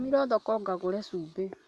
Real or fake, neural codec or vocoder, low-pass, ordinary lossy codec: fake; vocoder, 24 kHz, 100 mel bands, Vocos; none; none